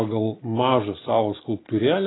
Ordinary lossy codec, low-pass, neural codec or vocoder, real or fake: AAC, 16 kbps; 7.2 kHz; none; real